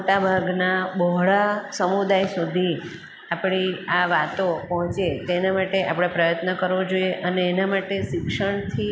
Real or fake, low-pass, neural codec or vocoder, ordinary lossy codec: real; none; none; none